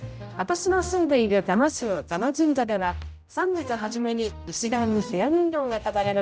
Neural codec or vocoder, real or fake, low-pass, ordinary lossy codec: codec, 16 kHz, 0.5 kbps, X-Codec, HuBERT features, trained on general audio; fake; none; none